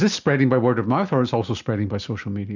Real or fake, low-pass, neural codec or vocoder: real; 7.2 kHz; none